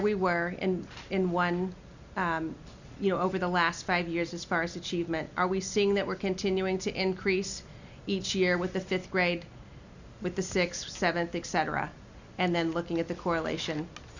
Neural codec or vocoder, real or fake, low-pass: none; real; 7.2 kHz